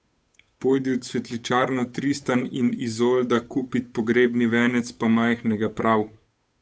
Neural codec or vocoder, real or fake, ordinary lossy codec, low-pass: codec, 16 kHz, 8 kbps, FunCodec, trained on Chinese and English, 25 frames a second; fake; none; none